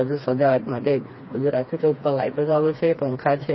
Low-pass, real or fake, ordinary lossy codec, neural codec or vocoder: 7.2 kHz; fake; MP3, 24 kbps; codec, 16 kHz, 2 kbps, FreqCodec, smaller model